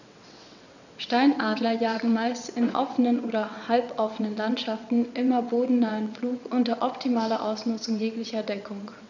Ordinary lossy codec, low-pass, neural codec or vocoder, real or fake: none; 7.2 kHz; vocoder, 22.05 kHz, 80 mel bands, WaveNeXt; fake